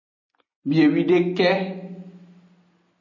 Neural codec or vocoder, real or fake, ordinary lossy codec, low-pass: none; real; MP3, 32 kbps; 7.2 kHz